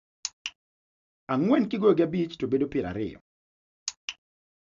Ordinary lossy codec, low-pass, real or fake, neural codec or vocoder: AAC, 96 kbps; 7.2 kHz; real; none